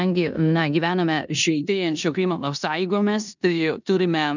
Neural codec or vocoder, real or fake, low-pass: codec, 16 kHz in and 24 kHz out, 0.9 kbps, LongCat-Audio-Codec, four codebook decoder; fake; 7.2 kHz